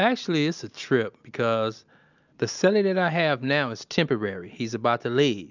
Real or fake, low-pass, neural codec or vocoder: real; 7.2 kHz; none